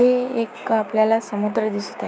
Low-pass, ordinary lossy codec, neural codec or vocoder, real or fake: none; none; codec, 16 kHz, 6 kbps, DAC; fake